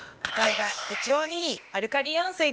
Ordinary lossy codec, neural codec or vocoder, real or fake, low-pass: none; codec, 16 kHz, 0.8 kbps, ZipCodec; fake; none